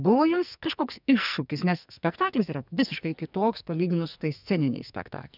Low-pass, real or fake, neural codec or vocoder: 5.4 kHz; fake; codec, 44.1 kHz, 2.6 kbps, SNAC